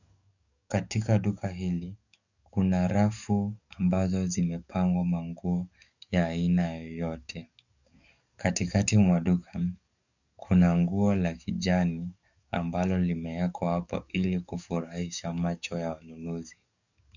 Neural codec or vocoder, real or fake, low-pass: codec, 44.1 kHz, 7.8 kbps, DAC; fake; 7.2 kHz